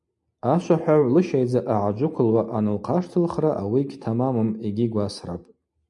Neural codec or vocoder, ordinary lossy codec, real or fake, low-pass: none; MP3, 64 kbps; real; 10.8 kHz